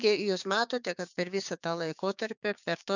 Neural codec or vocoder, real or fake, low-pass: codec, 16 kHz, 6 kbps, DAC; fake; 7.2 kHz